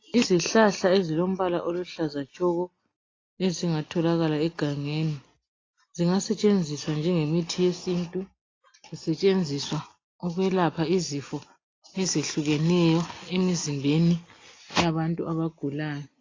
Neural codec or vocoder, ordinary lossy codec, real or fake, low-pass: none; AAC, 32 kbps; real; 7.2 kHz